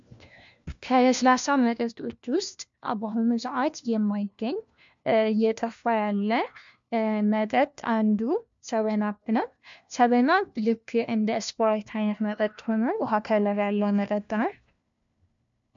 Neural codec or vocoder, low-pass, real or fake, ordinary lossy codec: codec, 16 kHz, 1 kbps, FunCodec, trained on LibriTTS, 50 frames a second; 7.2 kHz; fake; MP3, 64 kbps